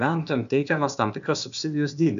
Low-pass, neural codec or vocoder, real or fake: 7.2 kHz; codec, 16 kHz, 0.8 kbps, ZipCodec; fake